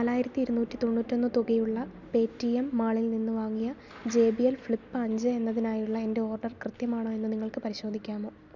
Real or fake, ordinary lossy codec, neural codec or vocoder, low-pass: real; none; none; 7.2 kHz